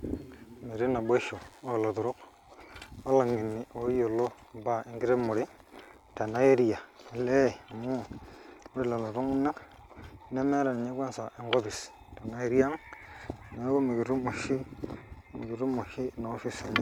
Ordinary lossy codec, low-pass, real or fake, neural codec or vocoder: none; 19.8 kHz; real; none